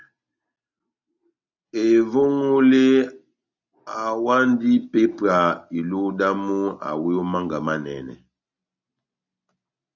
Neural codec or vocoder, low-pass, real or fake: none; 7.2 kHz; real